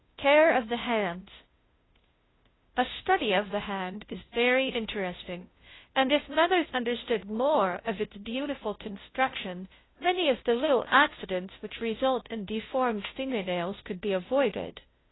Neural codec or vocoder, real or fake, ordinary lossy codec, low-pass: codec, 16 kHz, 0.5 kbps, FunCodec, trained on Chinese and English, 25 frames a second; fake; AAC, 16 kbps; 7.2 kHz